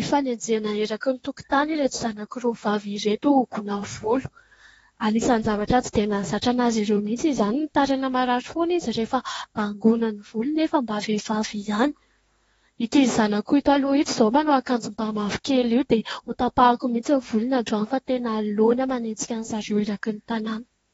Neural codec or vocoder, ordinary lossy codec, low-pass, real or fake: autoencoder, 48 kHz, 32 numbers a frame, DAC-VAE, trained on Japanese speech; AAC, 24 kbps; 19.8 kHz; fake